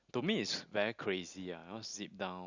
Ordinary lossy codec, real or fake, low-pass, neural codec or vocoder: Opus, 64 kbps; real; 7.2 kHz; none